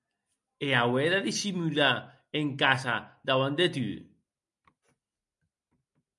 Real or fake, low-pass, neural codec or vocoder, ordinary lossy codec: real; 10.8 kHz; none; MP3, 96 kbps